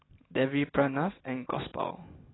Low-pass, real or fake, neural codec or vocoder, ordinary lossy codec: 7.2 kHz; real; none; AAC, 16 kbps